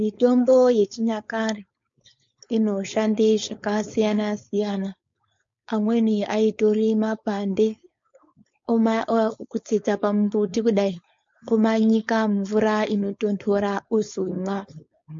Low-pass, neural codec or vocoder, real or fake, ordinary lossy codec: 7.2 kHz; codec, 16 kHz, 4.8 kbps, FACodec; fake; MP3, 48 kbps